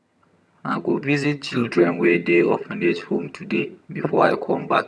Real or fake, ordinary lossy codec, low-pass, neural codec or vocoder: fake; none; none; vocoder, 22.05 kHz, 80 mel bands, HiFi-GAN